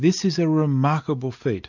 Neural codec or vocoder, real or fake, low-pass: none; real; 7.2 kHz